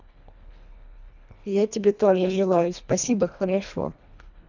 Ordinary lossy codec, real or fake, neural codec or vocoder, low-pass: none; fake; codec, 24 kHz, 1.5 kbps, HILCodec; 7.2 kHz